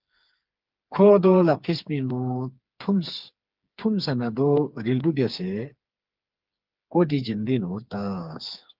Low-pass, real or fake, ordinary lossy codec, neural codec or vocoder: 5.4 kHz; fake; Opus, 32 kbps; codec, 16 kHz, 4 kbps, FreqCodec, smaller model